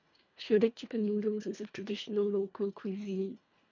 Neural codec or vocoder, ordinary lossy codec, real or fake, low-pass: codec, 24 kHz, 1.5 kbps, HILCodec; none; fake; 7.2 kHz